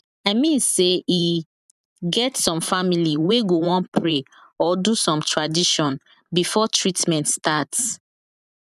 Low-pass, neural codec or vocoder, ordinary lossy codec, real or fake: 14.4 kHz; vocoder, 44.1 kHz, 128 mel bands every 512 samples, BigVGAN v2; none; fake